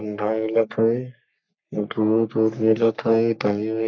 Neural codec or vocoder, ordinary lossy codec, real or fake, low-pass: codec, 44.1 kHz, 3.4 kbps, Pupu-Codec; none; fake; 7.2 kHz